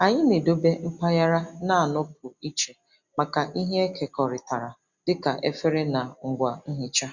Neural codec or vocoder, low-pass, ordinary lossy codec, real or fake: none; 7.2 kHz; Opus, 64 kbps; real